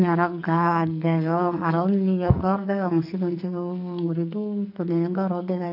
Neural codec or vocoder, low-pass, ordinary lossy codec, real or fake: codec, 44.1 kHz, 2.6 kbps, SNAC; 5.4 kHz; none; fake